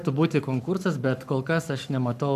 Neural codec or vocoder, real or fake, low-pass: codec, 44.1 kHz, 7.8 kbps, Pupu-Codec; fake; 14.4 kHz